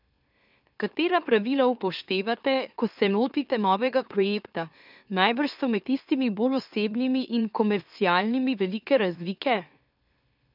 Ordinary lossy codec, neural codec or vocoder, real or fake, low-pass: none; autoencoder, 44.1 kHz, a latent of 192 numbers a frame, MeloTTS; fake; 5.4 kHz